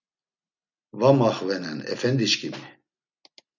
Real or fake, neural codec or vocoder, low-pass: real; none; 7.2 kHz